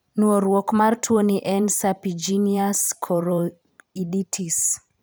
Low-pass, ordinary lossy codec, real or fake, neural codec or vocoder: none; none; fake; vocoder, 44.1 kHz, 128 mel bands every 512 samples, BigVGAN v2